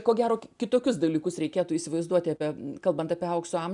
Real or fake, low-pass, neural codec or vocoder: real; 10.8 kHz; none